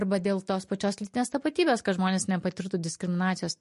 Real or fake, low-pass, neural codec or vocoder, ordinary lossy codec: real; 14.4 kHz; none; MP3, 48 kbps